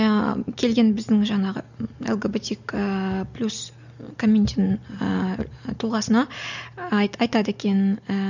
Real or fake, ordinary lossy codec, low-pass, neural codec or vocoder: real; none; 7.2 kHz; none